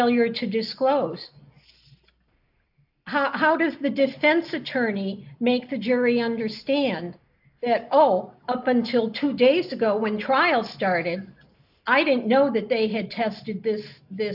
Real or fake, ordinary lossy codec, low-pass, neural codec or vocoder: real; AAC, 48 kbps; 5.4 kHz; none